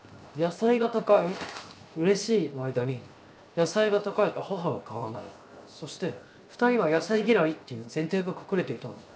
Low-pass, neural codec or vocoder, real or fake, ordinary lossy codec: none; codec, 16 kHz, 0.7 kbps, FocalCodec; fake; none